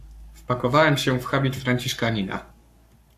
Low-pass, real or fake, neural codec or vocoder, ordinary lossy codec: 14.4 kHz; fake; codec, 44.1 kHz, 7.8 kbps, Pupu-Codec; AAC, 96 kbps